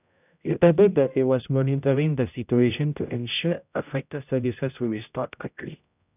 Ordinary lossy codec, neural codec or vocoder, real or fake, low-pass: none; codec, 16 kHz, 0.5 kbps, X-Codec, HuBERT features, trained on general audio; fake; 3.6 kHz